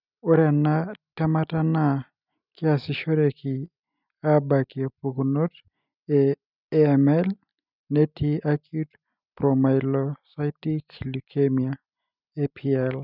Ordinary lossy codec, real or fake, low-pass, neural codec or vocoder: none; real; 5.4 kHz; none